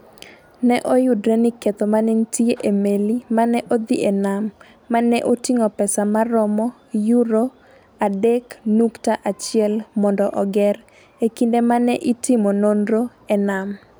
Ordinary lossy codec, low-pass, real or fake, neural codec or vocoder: none; none; real; none